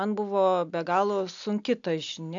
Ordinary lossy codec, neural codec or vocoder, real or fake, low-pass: AAC, 64 kbps; none; real; 7.2 kHz